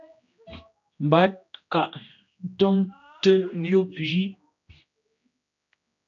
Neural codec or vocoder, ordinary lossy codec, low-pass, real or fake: codec, 16 kHz, 1 kbps, X-Codec, HuBERT features, trained on general audio; AAC, 64 kbps; 7.2 kHz; fake